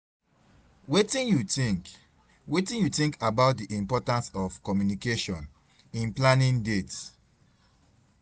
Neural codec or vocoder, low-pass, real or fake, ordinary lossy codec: none; none; real; none